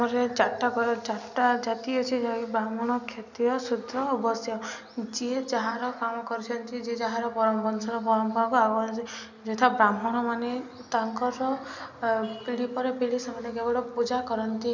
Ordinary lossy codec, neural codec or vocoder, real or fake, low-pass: none; none; real; 7.2 kHz